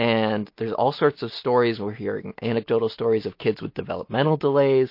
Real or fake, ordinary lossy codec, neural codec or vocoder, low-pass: real; MP3, 32 kbps; none; 5.4 kHz